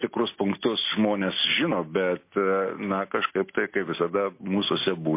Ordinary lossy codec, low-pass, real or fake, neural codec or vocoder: MP3, 24 kbps; 3.6 kHz; real; none